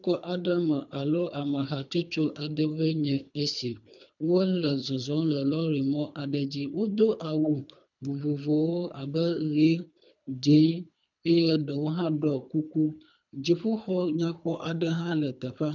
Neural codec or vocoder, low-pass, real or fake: codec, 24 kHz, 3 kbps, HILCodec; 7.2 kHz; fake